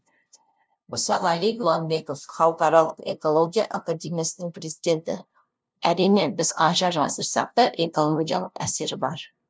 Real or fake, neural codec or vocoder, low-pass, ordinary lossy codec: fake; codec, 16 kHz, 0.5 kbps, FunCodec, trained on LibriTTS, 25 frames a second; none; none